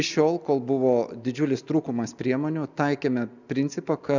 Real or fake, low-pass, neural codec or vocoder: real; 7.2 kHz; none